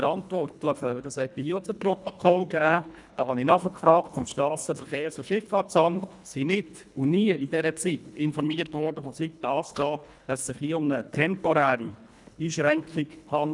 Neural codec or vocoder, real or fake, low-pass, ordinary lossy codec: codec, 24 kHz, 1.5 kbps, HILCodec; fake; 10.8 kHz; none